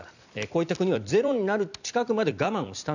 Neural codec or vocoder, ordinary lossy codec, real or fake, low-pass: vocoder, 44.1 kHz, 128 mel bands every 256 samples, BigVGAN v2; none; fake; 7.2 kHz